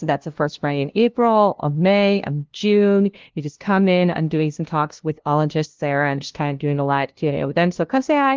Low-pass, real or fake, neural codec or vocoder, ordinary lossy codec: 7.2 kHz; fake; codec, 16 kHz, 0.5 kbps, FunCodec, trained on LibriTTS, 25 frames a second; Opus, 16 kbps